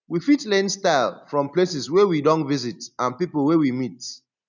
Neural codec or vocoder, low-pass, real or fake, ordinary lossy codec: none; 7.2 kHz; real; none